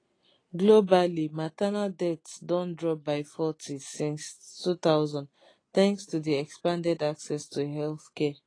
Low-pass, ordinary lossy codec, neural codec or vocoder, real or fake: 9.9 kHz; AAC, 32 kbps; none; real